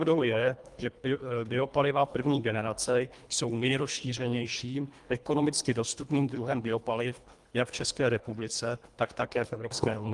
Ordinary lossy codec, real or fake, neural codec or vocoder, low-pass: Opus, 32 kbps; fake; codec, 24 kHz, 1.5 kbps, HILCodec; 10.8 kHz